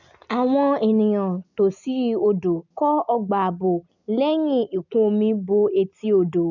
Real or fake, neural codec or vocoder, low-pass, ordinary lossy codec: real; none; 7.2 kHz; none